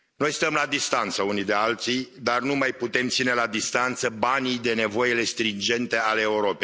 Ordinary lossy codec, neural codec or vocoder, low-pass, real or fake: none; none; none; real